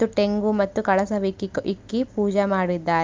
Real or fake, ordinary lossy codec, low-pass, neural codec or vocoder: real; Opus, 24 kbps; 7.2 kHz; none